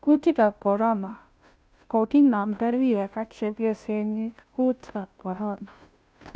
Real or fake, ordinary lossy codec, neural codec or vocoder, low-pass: fake; none; codec, 16 kHz, 0.5 kbps, FunCodec, trained on Chinese and English, 25 frames a second; none